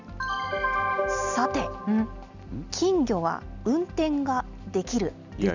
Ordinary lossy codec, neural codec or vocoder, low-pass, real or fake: none; none; 7.2 kHz; real